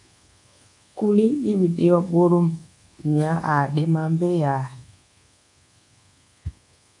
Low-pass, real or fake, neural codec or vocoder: 10.8 kHz; fake; codec, 24 kHz, 1.2 kbps, DualCodec